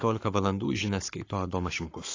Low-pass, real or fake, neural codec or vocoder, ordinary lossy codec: 7.2 kHz; fake; codec, 44.1 kHz, 7.8 kbps, Pupu-Codec; AAC, 32 kbps